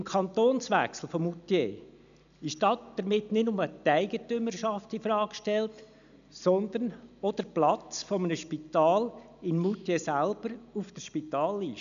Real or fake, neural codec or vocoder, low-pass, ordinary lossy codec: real; none; 7.2 kHz; MP3, 96 kbps